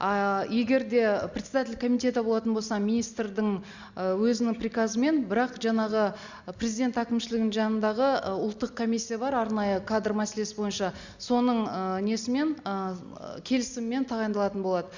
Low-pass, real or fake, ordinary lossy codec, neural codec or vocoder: 7.2 kHz; real; Opus, 64 kbps; none